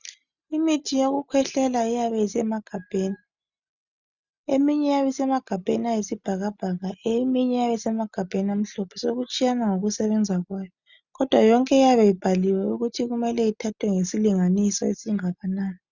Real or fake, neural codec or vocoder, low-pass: real; none; 7.2 kHz